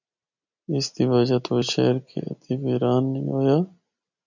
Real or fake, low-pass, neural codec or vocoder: real; 7.2 kHz; none